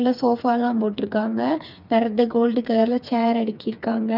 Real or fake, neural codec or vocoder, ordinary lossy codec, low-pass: fake; codec, 16 kHz, 4 kbps, FreqCodec, smaller model; none; 5.4 kHz